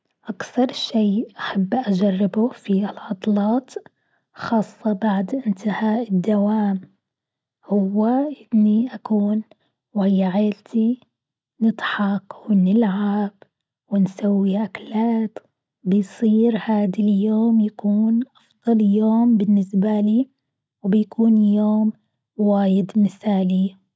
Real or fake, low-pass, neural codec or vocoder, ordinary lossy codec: real; none; none; none